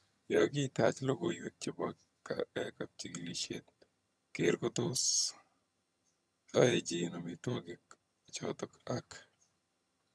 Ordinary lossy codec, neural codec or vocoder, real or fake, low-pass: none; vocoder, 22.05 kHz, 80 mel bands, HiFi-GAN; fake; none